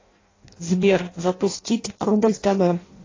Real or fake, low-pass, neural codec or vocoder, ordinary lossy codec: fake; 7.2 kHz; codec, 16 kHz in and 24 kHz out, 0.6 kbps, FireRedTTS-2 codec; AAC, 32 kbps